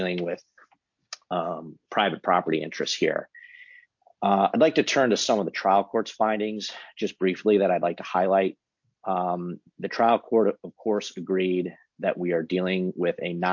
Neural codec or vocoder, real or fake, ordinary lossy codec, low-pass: none; real; MP3, 48 kbps; 7.2 kHz